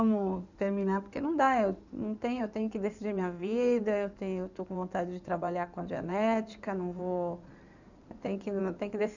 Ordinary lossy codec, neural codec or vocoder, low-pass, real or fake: none; codec, 16 kHz in and 24 kHz out, 2.2 kbps, FireRedTTS-2 codec; 7.2 kHz; fake